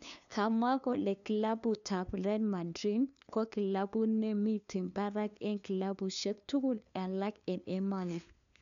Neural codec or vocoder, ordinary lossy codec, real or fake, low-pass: codec, 16 kHz, 2 kbps, FunCodec, trained on LibriTTS, 25 frames a second; none; fake; 7.2 kHz